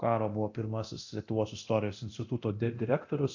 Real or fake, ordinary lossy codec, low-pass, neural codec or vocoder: fake; AAC, 48 kbps; 7.2 kHz; codec, 24 kHz, 0.9 kbps, DualCodec